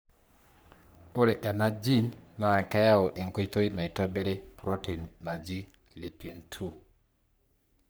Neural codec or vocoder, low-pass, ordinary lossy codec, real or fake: codec, 44.1 kHz, 3.4 kbps, Pupu-Codec; none; none; fake